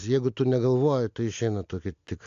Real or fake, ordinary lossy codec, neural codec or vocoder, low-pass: real; AAC, 64 kbps; none; 7.2 kHz